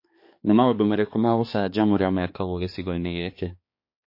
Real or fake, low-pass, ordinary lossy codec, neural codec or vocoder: fake; 5.4 kHz; MP3, 32 kbps; codec, 16 kHz, 2 kbps, X-Codec, HuBERT features, trained on balanced general audio